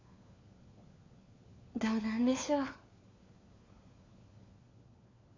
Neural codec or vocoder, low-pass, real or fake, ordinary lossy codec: codec, 16 kHz, 4 kbps, FunCodec, trained on LibriTTS, 50 frames a second; 7.2 kHz; fake; AAC, 32 kbps